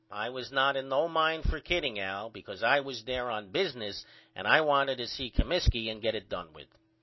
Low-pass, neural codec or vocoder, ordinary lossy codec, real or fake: 7.2 kHz; none; MP3, 24 kbps; real